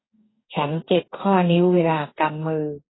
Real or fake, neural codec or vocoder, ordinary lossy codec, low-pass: fake; codec, 44.1 kHz, 2.6 kbps, DAC; AAC, 16 kbps; 7.2 kHz